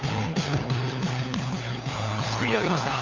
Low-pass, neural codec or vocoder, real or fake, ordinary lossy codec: 7.2 kHz; codec, 16 kHz, 4 kbps, FunCodec, trained on LibriTTS, 50 frames a second; fake; Opus, 64 kbps